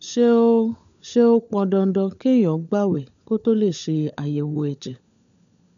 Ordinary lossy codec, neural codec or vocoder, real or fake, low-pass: none; codec, 16 kHz, 16 kbps, FunCodec, trained on LibriTTS, 50 frames a second; fake; 7.2 kHz